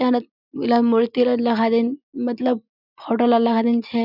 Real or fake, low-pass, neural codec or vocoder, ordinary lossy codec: real; 5.4 kHz; none; none